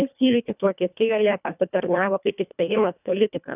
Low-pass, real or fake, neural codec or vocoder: 3.6 kHz; fake; codec, 24 kHz, 1.5 kbps, HILCodec